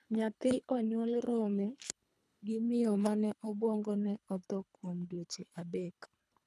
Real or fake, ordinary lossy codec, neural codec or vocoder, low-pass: fake; none; codec, 24 kHz, 3 kbps, HILCodec; none